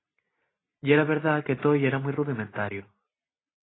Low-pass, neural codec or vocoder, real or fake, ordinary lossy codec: 7.2 kHz; none; real; AAC, 16 kbps